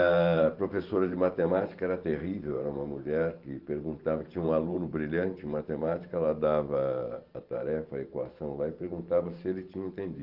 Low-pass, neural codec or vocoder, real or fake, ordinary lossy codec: 5.4 kHz; vocoder, 44.1 kHz, 128 mel bands every 512 samples, BigVGAN v2; fake; Opus, 32 kbps